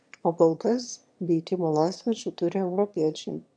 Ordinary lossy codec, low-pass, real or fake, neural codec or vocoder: AAC, 64 kbps; 9.9 kHz; fake; autoencoder, 22.05 kHz, a latent of 192 numbers a frame, VITS, trained on one speaker